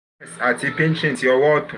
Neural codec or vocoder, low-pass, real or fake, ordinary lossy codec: none; 10.8 kHz; real; none